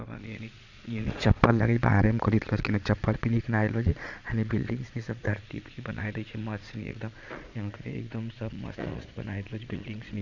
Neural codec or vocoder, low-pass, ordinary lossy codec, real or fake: vocoder, 22.05 kHz, 80 mel bands, Vocos; 7.2 kHz; none; fake